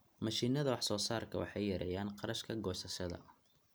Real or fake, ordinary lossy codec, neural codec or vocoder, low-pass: real; none; none; none